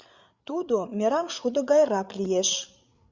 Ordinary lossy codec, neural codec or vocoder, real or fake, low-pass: Opus, 64 kbps; codec, 16 kHz, 8 kbps, FreqCodec, larger model; fake; 7.2 kHz